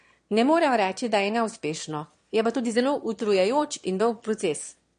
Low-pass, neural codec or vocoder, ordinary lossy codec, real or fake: 9.9 kHz; autoencoder, 22.05 kHz, a latent of 192 numbers a frame, VITS, trained on one speaker; MP3, 48 kbps; fake